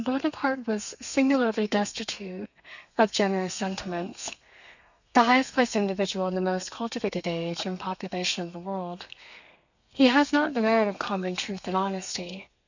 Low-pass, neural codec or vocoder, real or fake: 7.2 kHz; codec, 32 kHz, 1.9 kbps, SNAC; fake